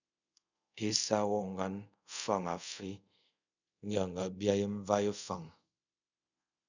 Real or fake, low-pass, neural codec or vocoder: fake; 7.2 kHz; codec, 24 kHz, 0.5 kbps, DualCodec